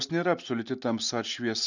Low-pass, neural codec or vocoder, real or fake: 7.2 kHz; none; real